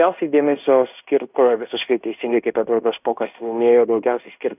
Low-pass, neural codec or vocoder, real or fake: 3.6 kHz; codec, 16 kHz in and 24 kHz out, 0.9 kbps, LongCat-Audio-Codec, fine tuned four codebook decoder; fake